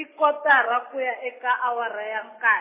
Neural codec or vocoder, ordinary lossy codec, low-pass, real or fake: none; MP3, 16 kbps; 3.6 kHz; real